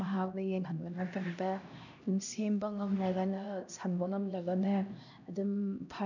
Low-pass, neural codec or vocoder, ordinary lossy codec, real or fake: 7.2 kHz; codec, 16 kHz, 1 kbps, X-Codec, HuBERT features, trained on LibriSpeech; none; fake